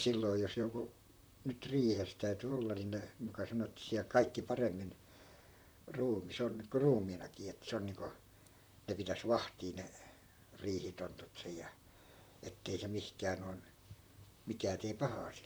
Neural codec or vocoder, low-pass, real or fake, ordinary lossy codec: vocoder, 44.1 kHz, 128 mel bands, Pupu-Vocoder; none; fake; none